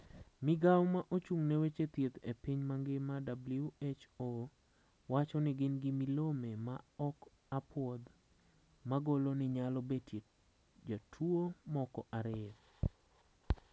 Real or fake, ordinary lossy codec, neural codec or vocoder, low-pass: real; none; none; none